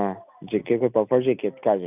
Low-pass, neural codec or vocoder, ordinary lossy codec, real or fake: 3.6 kHz; none; none; real